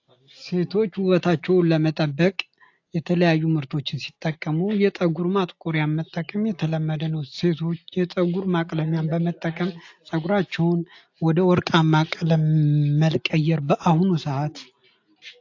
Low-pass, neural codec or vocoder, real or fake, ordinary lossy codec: 7.2 kHz; none; real; AAC, 48 kbps